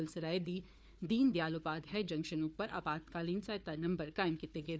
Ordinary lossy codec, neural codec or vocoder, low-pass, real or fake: none; codec, 16 kHz, 4 kbps, FunCodec, trained on Chinese and English, 50 frames a second; none; fake